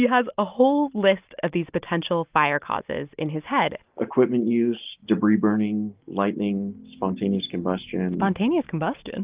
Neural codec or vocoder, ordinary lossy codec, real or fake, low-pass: none; Opus, 32 kbps; real; 3.6 kHz